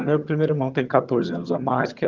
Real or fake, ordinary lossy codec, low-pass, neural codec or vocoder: fake; Opus, 32 kbps; 7.2 kHz; vocoder, 22.05 kHz, 80 mel bands, HiFi-GAN